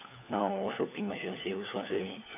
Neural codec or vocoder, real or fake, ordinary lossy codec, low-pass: codec, 16 kHz, 4 kbps, FunCodec, trained on LibriTTS, 50 frames a second; fake; none; 3.6 kHz